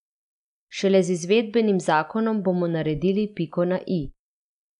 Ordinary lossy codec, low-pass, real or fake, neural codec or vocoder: none; 10.8 kHz; real; none